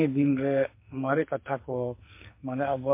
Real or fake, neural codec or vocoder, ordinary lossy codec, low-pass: fake; codec, 44.1 kHz, 2.6 kbps, SNAC; MP3, 24 kbps; 3.6 kHz